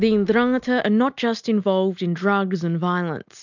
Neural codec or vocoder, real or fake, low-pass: none; real; 7.2 kHz